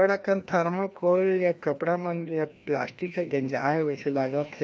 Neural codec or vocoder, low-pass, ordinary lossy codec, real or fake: codec, 16 kHz, 1 kbps, FreqCodec, larger model; none; none; fake